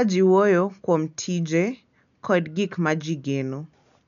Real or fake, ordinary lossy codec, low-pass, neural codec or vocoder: real; MP3, 96 kbps; 7.2 kHz; none